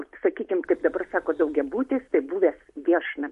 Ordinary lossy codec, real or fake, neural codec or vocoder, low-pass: MP3, 48 kbps; real; none; 14.4 kHz